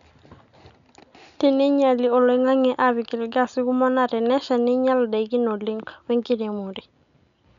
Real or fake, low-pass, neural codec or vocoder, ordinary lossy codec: real; 7.2 kHz; none; none